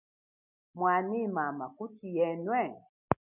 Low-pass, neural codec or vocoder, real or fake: 3.6 kHz; none; real